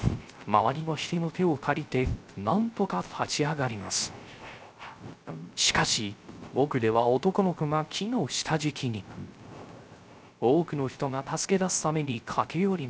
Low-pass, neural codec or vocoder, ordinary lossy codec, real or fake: none; codec, 16 kHz, 0.3 kbps, FocalCodec; none; fake